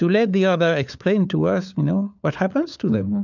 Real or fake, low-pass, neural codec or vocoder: fake; 7.2 kHz; codec, 16 kHz, 4 kbps, FunCodec, trained on LibriTTS, 50 frames a second